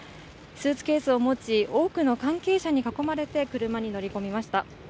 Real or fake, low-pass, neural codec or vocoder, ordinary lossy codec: real; none; none; none